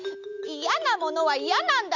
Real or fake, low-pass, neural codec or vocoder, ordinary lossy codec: real; 7.2 kHz; none; none